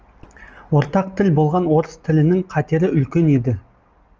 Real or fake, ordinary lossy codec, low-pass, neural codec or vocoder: real; Opus, 24 kbps; 7.2 kHz; none